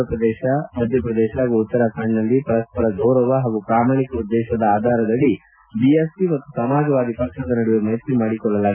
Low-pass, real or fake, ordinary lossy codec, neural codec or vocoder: 3.6 kHz; real; none; none